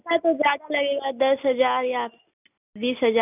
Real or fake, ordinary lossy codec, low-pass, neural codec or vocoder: real; none; 3.6 kHz; none